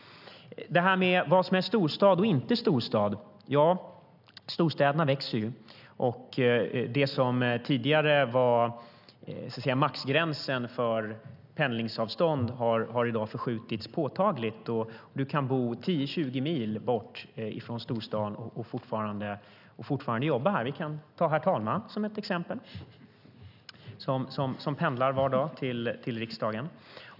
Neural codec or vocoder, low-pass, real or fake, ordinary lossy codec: none; 5.4 kHz; real; none